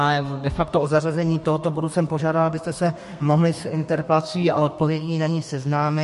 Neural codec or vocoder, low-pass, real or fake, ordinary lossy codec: codec, 32 kHz, 1.9 kbps, SNAC; 14.4 kHz; fake; MP3, 48 kbps